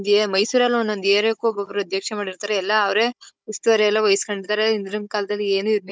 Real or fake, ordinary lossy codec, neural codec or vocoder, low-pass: fake; none; codec, 16 kHz, 16 kbps, FunCodec, trained on Chinese and English, 50 frames a second; none